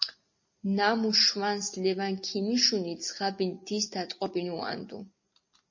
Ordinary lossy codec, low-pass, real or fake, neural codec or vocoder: MP3, 32 kbps; 7.2 kHz; real; none